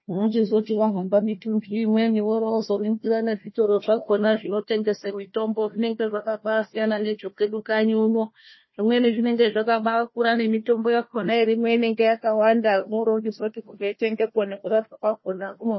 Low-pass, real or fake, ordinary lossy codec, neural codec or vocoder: 7.2 kHz; fake; MP3, 24 kbps; codec, 16 kHz, 1 kbps, FunCodec, trained on Chinese and English, 50 frames a second